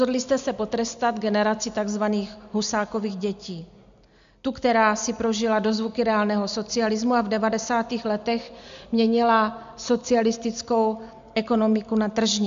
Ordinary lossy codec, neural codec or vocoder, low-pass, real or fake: MP3, 64 kbps; none; 7.2 kHz; real